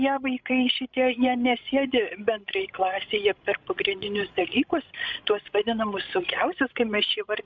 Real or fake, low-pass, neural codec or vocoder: fake; 7.2 kHz; codec, 16 kHz, 16 kbps, FreqCodec, larger model